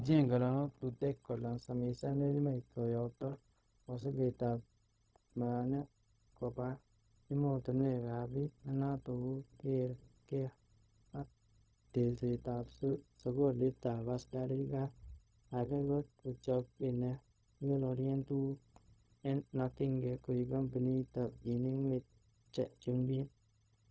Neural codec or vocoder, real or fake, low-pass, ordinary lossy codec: codec, 16 kHz, 0.4 kbps, LongCat-Audio-Codec; fake; none; none